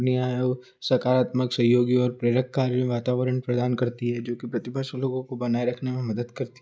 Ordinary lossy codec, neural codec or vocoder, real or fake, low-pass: none; none; real; none